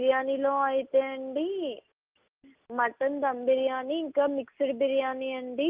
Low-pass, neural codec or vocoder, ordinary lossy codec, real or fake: 3.6 kHz; none; Opus, 24 kbps; real